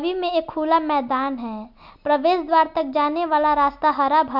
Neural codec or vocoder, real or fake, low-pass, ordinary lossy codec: none; real; 5.4 kHz; none